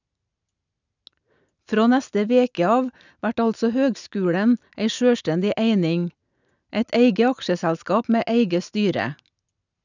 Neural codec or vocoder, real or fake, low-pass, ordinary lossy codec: none; real; 7.2 kHz; none